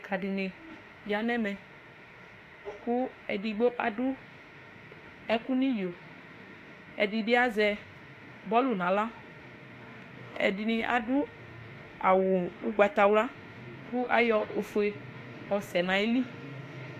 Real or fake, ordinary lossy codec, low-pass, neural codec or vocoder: fake; AAC, 64 kbps; 14.4 kHz; autoencoder, 48 kHz, 32 numbers a frame, DAC-VAE, trained on Japanese speech